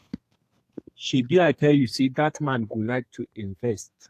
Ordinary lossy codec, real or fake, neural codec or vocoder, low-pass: AAC, 64 kbps; fake; codec, 32 kHz, 1.9 kbps, SNAC; 14.4 kHz